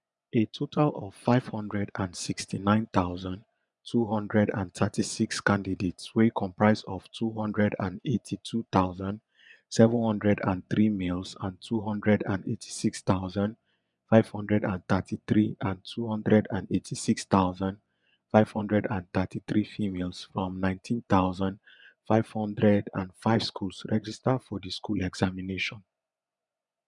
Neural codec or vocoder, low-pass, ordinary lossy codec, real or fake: vocoder, 24 kHz, 100 mel bands, Vocos; 10.8 kHz; none; fake